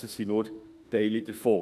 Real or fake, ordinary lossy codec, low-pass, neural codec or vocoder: fake; none; 14.4 kHz; autoencoder, 48 kHz, 32 numbers a frame, DAC-VAE, trained on Japanese speech